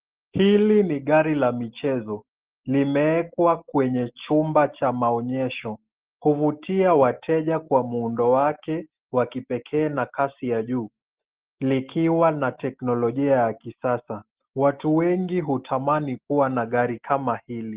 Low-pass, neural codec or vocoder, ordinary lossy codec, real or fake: 3.6 kHz; none; Opus, 16 kbps; real